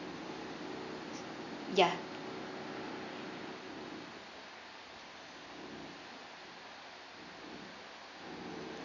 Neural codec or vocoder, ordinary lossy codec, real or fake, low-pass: none; none; real; 7.2 kHz